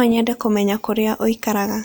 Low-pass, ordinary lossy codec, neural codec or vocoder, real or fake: none; none; none; real